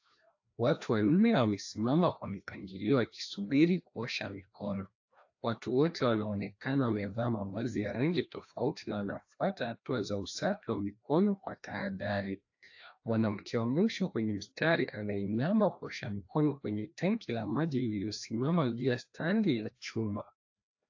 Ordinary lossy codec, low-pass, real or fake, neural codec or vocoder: AAC, 48 kbps; 7.2 kHz; fake; codec, 16 kHz, 1 kbps, FreqCodec, larger model